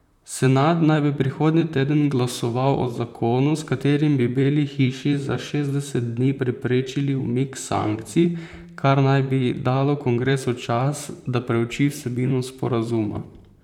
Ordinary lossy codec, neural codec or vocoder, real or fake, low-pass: none; vocoder, 44.1 kHz, 128 mel bands, Pupu-Vocoder; fake; 19.8 kHz